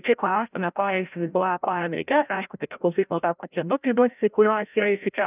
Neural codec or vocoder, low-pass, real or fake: codec, 16 kHz, 0.5 kbps, FreqCodec, larger model; 3.6 kHz; fake